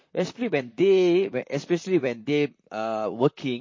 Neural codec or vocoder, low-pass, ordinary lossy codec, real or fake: vocoder, 44.1 kHz, 128 mel bands, Pupu-Vocoder; 7.2 kHz; MP3, 32 kbps; fake